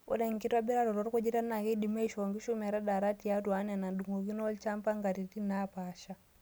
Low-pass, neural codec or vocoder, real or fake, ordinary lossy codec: none; none; real; none